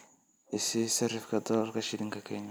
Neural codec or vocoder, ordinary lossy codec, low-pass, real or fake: none; none; none; real